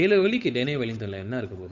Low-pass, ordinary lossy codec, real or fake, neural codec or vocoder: 7.2 kHz; none; fake; codec, 16 kHz, 8 kbps, FunCodec, trained on Chinese and English, 25 frames a second